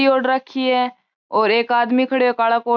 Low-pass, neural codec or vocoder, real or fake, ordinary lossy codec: 7.2 kHz; none; real; none